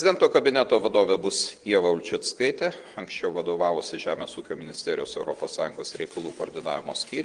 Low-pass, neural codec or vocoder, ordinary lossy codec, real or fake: 9.9 kHz; vocoder, 22.05 kHz, 80 mel bands, WaveNeXt; Opus, 24 kbps; fake